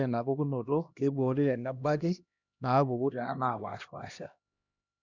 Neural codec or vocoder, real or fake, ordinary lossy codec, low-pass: codec, 16 kHz, 1 kbps, X-Codec, HuBERT features, trained on LibriSpeech; fake; none; 7.2 kHz